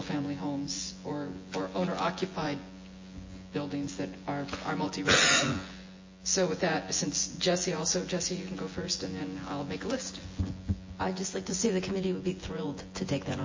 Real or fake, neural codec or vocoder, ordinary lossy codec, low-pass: fake; vocoder, 24 kHz, 100 mel bands, Vocos; MP3, 32 kbps; 7.2 kHz